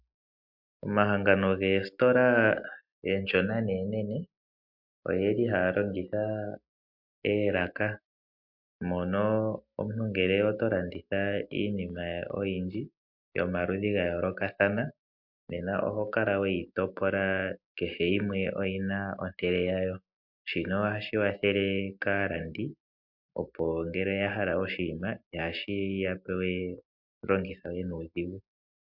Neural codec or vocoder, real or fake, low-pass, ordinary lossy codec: none; real; 5.4 kHz; MP3, 48 kbps